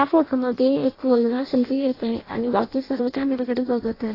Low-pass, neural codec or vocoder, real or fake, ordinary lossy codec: 5.4 kHz; codec, 16 kHz in and 24 kHz out, 0.6 kbps, FireRedTTS-2 codec; fake; AAC, 24 kbps